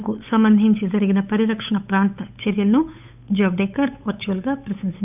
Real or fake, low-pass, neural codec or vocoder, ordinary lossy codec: fake; 3.6 kHz; codec, 16 kHz, 8 kbps, FunCodec, trained on Chinese and English, 25 frames a second; none